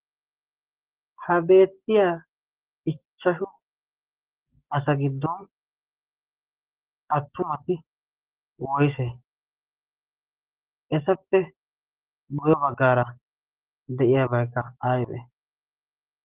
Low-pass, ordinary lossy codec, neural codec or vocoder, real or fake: 3.6 kHz; Opus, 16 kbps; none; real